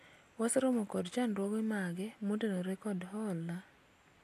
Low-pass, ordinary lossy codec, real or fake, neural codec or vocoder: 14.4 kHz; AAC, 64 kbps; real; none